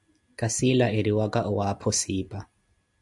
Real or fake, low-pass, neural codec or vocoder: real; 10.8 kHz; none